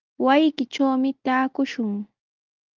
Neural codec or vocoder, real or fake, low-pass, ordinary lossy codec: none; real; 7.2 kHz; Opus, 32 kbps